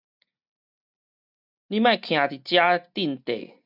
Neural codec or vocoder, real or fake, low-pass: none; real; 5.4 kHz